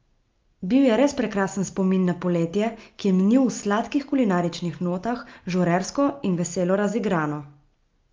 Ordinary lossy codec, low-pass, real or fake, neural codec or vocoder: Opus, 24 kbps; 7.2 kHz; real; none